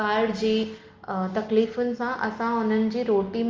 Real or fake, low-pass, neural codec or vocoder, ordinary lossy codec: real; 7.2 kHz; none; Opus, 24 kbps